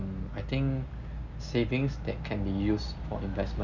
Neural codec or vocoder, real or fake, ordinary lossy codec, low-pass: none; real; none; 7.2 kHz